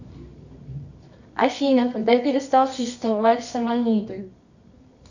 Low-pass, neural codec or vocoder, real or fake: 7.2 kHz; codec, 24 kHz, 0.9 kbps, WavTokenizer, medium music audio release; fake